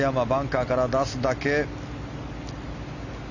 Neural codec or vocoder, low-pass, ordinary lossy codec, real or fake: none; 7.2 kHz; none; real